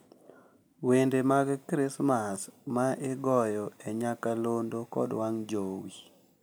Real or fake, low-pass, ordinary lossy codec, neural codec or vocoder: real; none; none; none